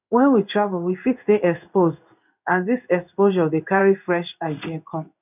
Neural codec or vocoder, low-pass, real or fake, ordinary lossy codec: codec, 16 kHz in and 24 kHz out, 1 kbps, XY-Tokenizer; 3.6 kHz; fake; none